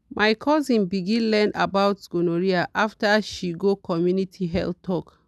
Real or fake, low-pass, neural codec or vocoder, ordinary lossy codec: real; none; none; none